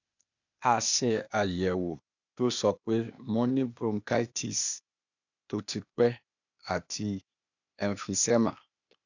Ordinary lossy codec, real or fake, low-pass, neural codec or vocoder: none; fake; 7.2 kHz; codec, 16 kHz, 0.8 kbps, ZipCodec